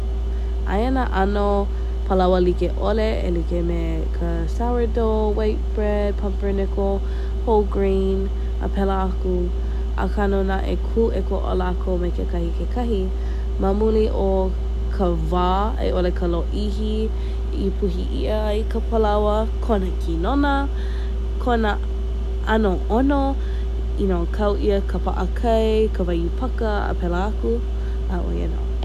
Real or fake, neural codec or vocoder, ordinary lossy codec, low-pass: real; none; none; 14.4 kHz